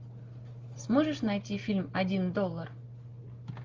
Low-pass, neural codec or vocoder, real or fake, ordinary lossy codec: 7.2 kHz; none; real; Opus, 32 kbps